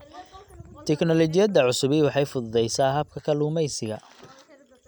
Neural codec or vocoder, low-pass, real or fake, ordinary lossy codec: none; 19.8 kHz; real; none